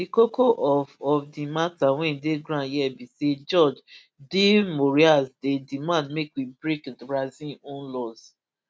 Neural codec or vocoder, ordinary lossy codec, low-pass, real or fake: none; none; none; real